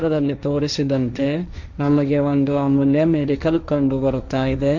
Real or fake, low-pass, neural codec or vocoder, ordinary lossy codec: fake; 7.2 kHz; codec, 16 kHz, 1.1 kbps, Voila-Tokenizer; none